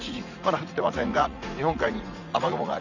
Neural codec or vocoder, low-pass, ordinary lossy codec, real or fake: vocoder, 44.1 kHz, 80 mel bands, Vocos; 7.2 kHz; none; fake